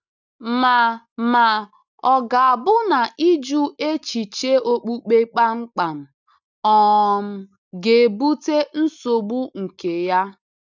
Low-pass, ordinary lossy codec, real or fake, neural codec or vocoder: 7.2 kHz; none; real; none